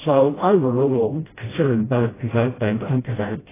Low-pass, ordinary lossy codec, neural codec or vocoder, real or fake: 3.6 kHz; AAC, 16 kbps; codec, 16 kHz, 0.5 kbps, FreqCodec, smaller model; fake